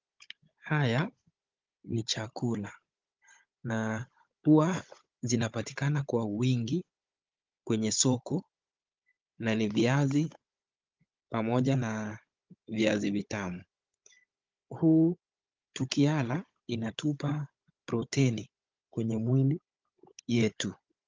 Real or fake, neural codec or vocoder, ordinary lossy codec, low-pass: fake; codec, 16 kHz, 16 kbps, FunCodec, trained on Chinese and English, 50 frames a second; Opus, 32 kbps; 7.2 kHz